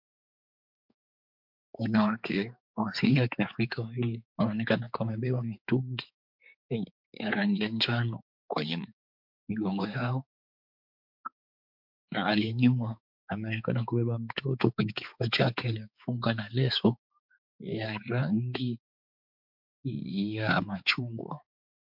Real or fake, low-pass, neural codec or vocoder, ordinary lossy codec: fake; 5.4 kHz; codec, 16 kHz, 4 kbps, X-Codec, HuBERT features, trained on balanced general audio; MP3, 48 kbps